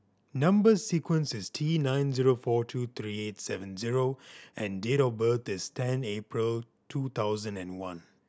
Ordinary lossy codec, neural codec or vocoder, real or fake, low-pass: none; none; real; none